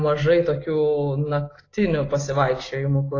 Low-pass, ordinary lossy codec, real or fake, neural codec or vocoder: 7.2 kHz; AAC, 32 kbps; real; none